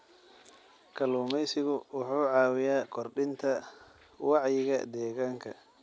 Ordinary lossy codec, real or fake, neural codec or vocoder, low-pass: none; real; none; none